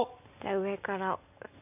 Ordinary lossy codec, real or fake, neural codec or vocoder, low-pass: none; real; none; 3.6 kHz